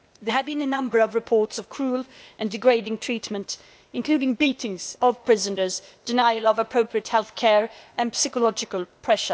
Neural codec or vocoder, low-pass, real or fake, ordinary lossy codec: codec, 16 kHz, 0.8 kbps, ZipCodec; none; fake; none